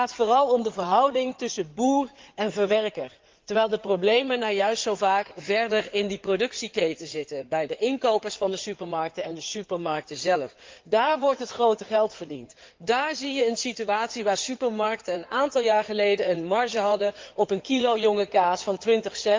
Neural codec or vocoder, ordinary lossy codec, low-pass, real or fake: codec, 16 kHz in and 24 kHz out, 2.2 kbps, FireRedTTS-2 codec; Opus, 32 kbps; 7.2 kHz; fake